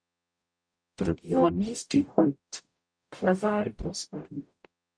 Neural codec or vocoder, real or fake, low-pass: codec, 44.1 kHz, 0.9 kbps, DAC; fake; 9.9 kHz